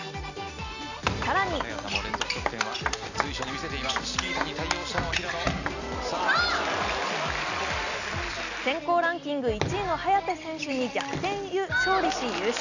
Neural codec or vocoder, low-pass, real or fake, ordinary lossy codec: none; 7.2 kHz; real; none